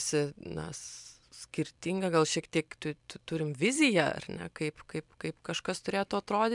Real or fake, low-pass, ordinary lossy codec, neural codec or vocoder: fake; 10.8 kHz; MP3, 96 kbps; vocoder, 44.1 kHz, 128 mel bands every 256 samples, BigVGAN v2